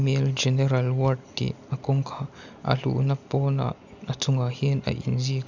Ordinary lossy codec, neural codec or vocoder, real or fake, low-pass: none; none; real; 7.2 kHz